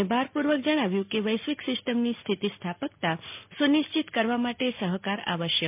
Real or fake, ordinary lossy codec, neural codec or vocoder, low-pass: real; MP3, 32 kbps; none; 3.6 kHz